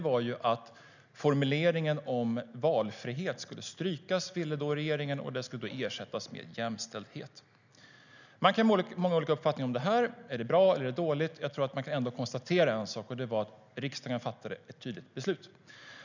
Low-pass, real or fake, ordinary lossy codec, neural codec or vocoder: 7.2 kHz; real; none; none